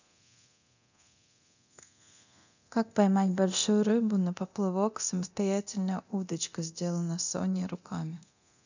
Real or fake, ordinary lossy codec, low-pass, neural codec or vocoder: fake; none; 7.2 kHz; codec, 24 kHz, 0.9 kbps, DualCodec